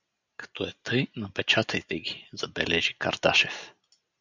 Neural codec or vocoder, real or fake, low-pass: none; real; 7.2 kHz